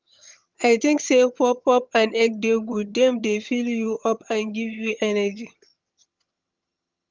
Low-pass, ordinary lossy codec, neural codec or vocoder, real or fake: 7.2 kHz; Opus, 32 kbps; vocoder, 22.05 kHz, 80 mel bands, HiFi-GAN; fake